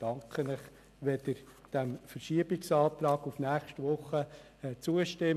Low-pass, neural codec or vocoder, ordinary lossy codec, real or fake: 14.4 kHz; none; none; real